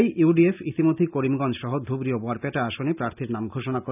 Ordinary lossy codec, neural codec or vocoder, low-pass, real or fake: none; none; 3.6 kHz; real